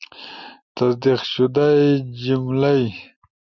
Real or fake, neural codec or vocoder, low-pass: real; none; 7.2 kHz